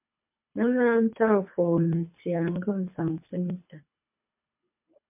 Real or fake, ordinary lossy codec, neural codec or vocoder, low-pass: fake; MP3, 32 kbps; codec, 24 kHz, 3 kbps, HILCodec; 3.6 kHz